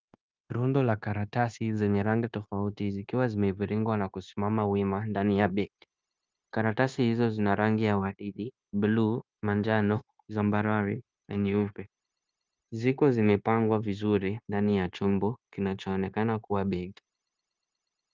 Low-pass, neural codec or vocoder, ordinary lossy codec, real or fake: 7.2 kHz; codec, 16 kHz, 0.9 kbps, LongCat-Audio-Codec; Opus, 32 kbps; fake